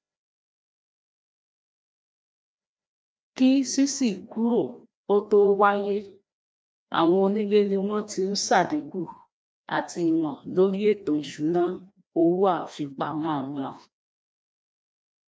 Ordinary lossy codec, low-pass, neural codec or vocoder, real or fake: none; none; codec, 16 kHz, 1 kbps, FreqCodec, larger model; fake